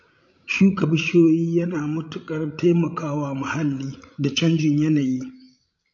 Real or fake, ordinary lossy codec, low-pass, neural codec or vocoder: fake; AAC, 48 kbps; 7.2 kHz; codec, 16 kHz, 16 kbps, FreqCodec, larger model